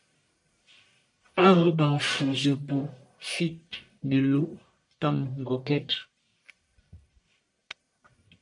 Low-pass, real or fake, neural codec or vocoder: 10.8 kHz; fake; codec, 44.1 kHz, 1.7 kbps, Pupu-Codec